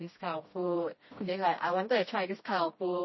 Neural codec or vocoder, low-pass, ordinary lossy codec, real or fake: codec, 16 kHz, 1 kbps, FreqCodec, smaller model; 7.2 kHz; MP3, 24 kbps; fake